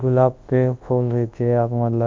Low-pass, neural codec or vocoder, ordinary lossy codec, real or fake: 7.2 kHz; codec, 24 kHz, 0.9 kbps, WavTokenizer, large speech release; Opus, 24 kbps; fake